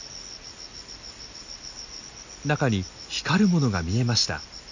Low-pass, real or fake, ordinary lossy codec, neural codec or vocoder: 7.2 kHz; real; none; none